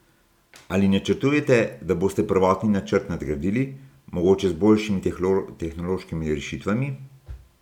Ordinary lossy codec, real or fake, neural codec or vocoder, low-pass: none; real; none; 19.8 kHz